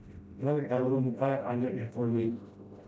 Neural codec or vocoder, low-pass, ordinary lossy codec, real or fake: codec, 16 kHz, 0.5 kbps, FreqCodec, smaller model; none; none; fake